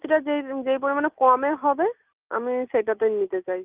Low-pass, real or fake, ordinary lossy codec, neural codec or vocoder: 3.6 kHz; real; Opus, 24 kbps; none